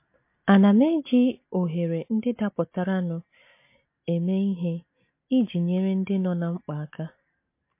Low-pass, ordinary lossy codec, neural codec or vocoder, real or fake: 3.6 kHz; MP3, 24 kbps; none; real